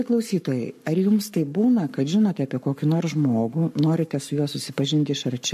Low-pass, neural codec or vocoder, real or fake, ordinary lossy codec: 14.4 kHz; codec, 44.1 kHz, 7.8 kbps, Pupu-Codec; fake; MP3, 64 kbps